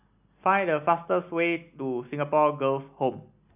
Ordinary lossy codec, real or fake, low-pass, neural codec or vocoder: none; real; 3.6 kHz; none